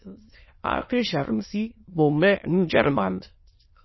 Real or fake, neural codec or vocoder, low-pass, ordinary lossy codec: fake; autoencoder, 22.05 kHz, a latent of 192 numbers a frame, VITS, trained on many speakers; 7.2 kHz; MP3, 24 kbps